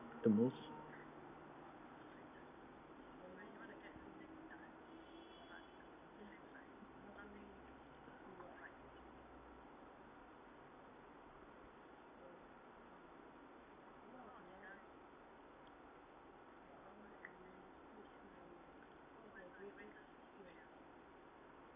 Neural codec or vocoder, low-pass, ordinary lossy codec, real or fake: none; 3.6 kHz; none; real